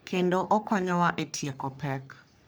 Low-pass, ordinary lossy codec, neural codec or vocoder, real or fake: none; none; codec, 44.1 kHz, 3.4 kbps, Pupu-Codec; fake